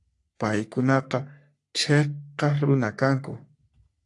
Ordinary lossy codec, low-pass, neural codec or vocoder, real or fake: AAC, 64 kbps; 10.8 kHz; codec, 44.1 kHz, 3.4 kbps, Pupu-Codec; fake